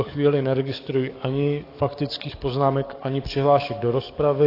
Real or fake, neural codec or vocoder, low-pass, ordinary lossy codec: fake; codec, 44.1 kHz, 7.8 kbps, DAC; 5.4 kHz; AAC, 32 kbps